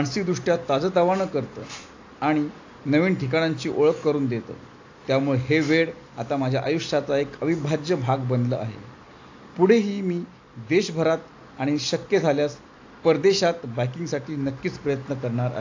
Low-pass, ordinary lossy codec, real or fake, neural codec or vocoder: 7.2 kHz; AAC, 48 kbps; real; none